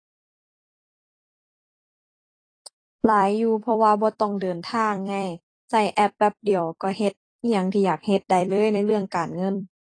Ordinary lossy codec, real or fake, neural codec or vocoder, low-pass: AAC, 32 kbps; fake; vocoder, 44.1 kHz, 128 mel bands every 256 samples, BigVGAN v2; 9.9 kHz